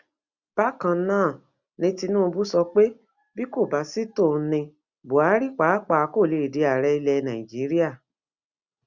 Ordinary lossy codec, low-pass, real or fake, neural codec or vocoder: Opus, 64 kbps; 7.2 kHz; real; none